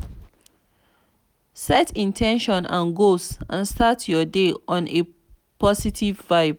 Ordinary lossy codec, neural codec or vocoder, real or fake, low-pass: none; none; real; 19.8 kHz